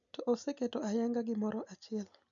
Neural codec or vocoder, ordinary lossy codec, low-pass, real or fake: none; none; 7.2 kHz; real